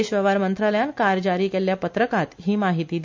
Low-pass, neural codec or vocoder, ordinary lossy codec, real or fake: 7.2 kHz; none; MP3, 64 kbps; real